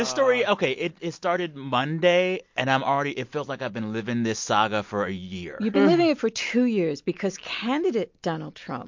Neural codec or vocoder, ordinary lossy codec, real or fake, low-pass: none; MP3, 48 kbps; real; 7.2 kHz